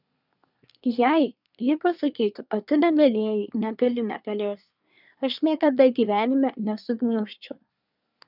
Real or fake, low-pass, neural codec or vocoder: fake; 5.4 kHz; codec, 24 kHz, 1 kbps, SNAC